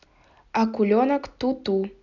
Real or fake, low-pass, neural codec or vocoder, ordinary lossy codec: real; 7.2 kHz; none; none